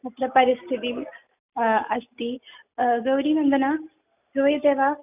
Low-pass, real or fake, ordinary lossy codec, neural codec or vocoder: 3.6 kHz; real; none; none